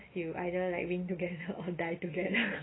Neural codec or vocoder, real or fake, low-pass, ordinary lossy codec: none; real; 7.2 kHz; AAC, 16 kbps